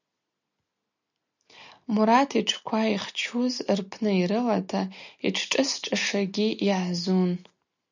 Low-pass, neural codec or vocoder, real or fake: 7.2 kHz; none; real